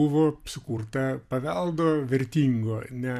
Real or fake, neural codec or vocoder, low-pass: fake; vocoder, 44.1 kHz, 128 mel bands every 256 samples, BigVGAN v2; 14.4 kHz